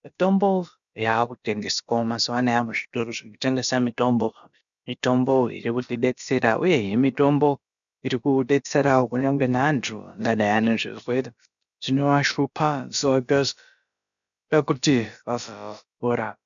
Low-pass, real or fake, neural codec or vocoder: 7.2 kHz; fake; codec, 16 kHz, about 1 kbps, DyCAST, with the encoder's durations